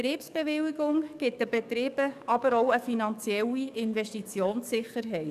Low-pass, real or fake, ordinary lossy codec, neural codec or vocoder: 14.4 kHz; fake; none; codec, 44.1 kHz, 7.8 kbps, DAC